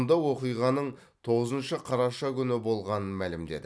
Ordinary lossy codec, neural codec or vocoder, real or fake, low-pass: none; none; real; none